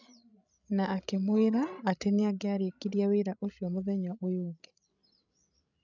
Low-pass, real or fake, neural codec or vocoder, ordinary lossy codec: 7.2 kHz; fake; codec, 16 kHz, 16 kbps, FreqCodec, larger model; none